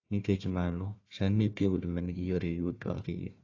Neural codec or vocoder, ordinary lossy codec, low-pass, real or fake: codec, 16 kHz, 1 kbps, FunCodec, trained on Chinese and English, 50 frames a second; AAC, 32 kbps; 7.2 kHz; fake